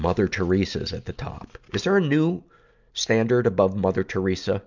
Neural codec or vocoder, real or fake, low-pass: none; real; 7.2 kHz